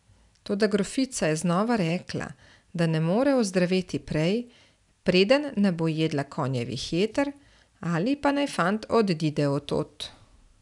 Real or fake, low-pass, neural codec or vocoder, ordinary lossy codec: real; 10.8 kHz; none; none